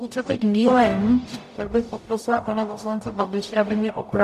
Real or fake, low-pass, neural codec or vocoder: fake; 14.4 kHz; codec, 44.1 kHz, 0.9 kbps, DAC